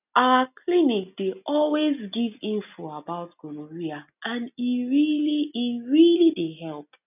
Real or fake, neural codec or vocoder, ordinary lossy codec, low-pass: real; none; none; 3.6 kHz